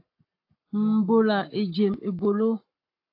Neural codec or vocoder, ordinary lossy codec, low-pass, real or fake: codec, 44.1 kHz, 7.8 kbps, Pupu-Codec; AAC, 32 kbps; 5.4 kHz; fake